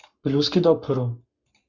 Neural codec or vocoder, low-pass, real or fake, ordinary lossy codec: codec, 44.1 kHz, 7.8 kbps, Pupu-Codec; 7.2 kHz; fake; Opus, 64 kbps